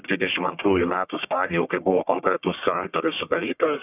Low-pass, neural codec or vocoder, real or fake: 3.6 kHz; codec, 44.1 kHz, 1.7 kbps, Pupu-Codec; fake